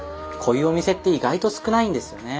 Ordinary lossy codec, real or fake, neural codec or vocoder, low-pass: none; real; none; none